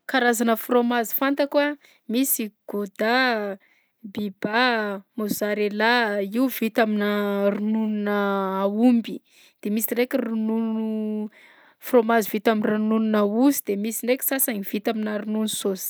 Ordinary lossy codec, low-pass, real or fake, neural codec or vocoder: none; none; real; none